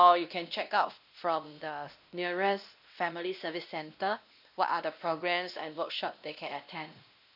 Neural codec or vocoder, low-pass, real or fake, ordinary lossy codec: codec, 16 kHz, 1 kbps, X-Codec, WavLM features, trained on Multilingual LibriSpeech; 5.4 kHz; fake; none